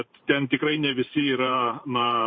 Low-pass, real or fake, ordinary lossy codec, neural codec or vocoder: 7.2 kHz; fake; MP3, 24 kbps; vocoder, 44.1 kHz, 128 mel bands every 512 samples, BigVGAN v2